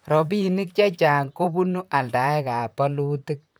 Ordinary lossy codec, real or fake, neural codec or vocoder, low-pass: none; fake; vocoder, 44.1 kHz, 128 mel bands, Pupu-Vocoder; none